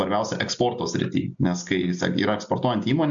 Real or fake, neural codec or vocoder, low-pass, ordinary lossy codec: real; none; 7.2 kHz; MP3, 64 kbps